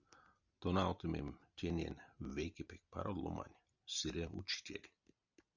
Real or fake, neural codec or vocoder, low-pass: real; none; 7.2 kHz